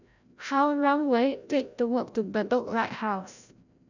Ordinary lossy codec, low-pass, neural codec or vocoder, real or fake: none; 7.2 kHz; codec, 16 kHz, 0.5 kbps, FreqCodec, larger model; fake